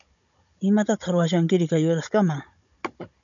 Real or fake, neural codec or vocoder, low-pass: fake; codec, 16 kHz, 16 kbps, FunCodec, trained on Chinese and English, 50 frames a second; 7.2 kHz